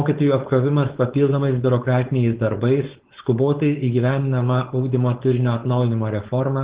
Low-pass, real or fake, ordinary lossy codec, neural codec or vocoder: 3.6 kHz; fake; Opus, 16 kbps; codec, 16 kHz, 4.8 kbps, FACodec